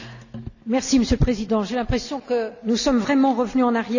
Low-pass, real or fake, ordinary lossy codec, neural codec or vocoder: 7.2 kHz; real; none; none